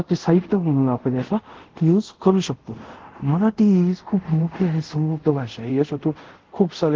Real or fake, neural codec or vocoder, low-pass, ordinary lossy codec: fake; codec, 24 kHz, 0.5 kbps, DualCodec; 7.2 kHz; Opus, 16 kbps